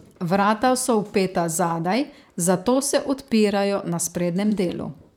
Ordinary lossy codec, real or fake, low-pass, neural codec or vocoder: none; fake; 19.8 kHz; vocoder, 44.1 kHz, 128 mel bands, Pupu-Vocoder